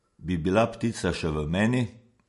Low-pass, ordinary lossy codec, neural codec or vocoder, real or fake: 14.4 kHz; MP3, 48 kbps; none; real